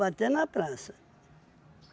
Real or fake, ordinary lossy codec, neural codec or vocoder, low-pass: real; none; none; none